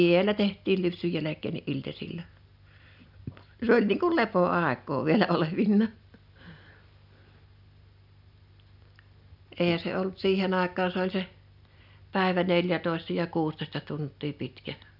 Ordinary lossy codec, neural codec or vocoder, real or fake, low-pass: none; none; real; 5.4 kHz